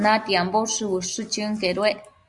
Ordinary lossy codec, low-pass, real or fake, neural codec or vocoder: Opus, 64 kbps; 10.8 kHz; real; none